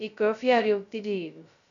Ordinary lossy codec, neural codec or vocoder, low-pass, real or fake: none; codec, 16 kHz, 0.2 kbps, FocalCodec; 7.2 kHz; fake